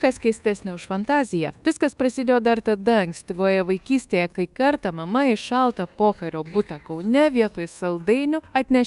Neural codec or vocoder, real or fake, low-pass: codec, 24 kHz, 1.2 kbps, DualCodec; fake; 10.8 kHz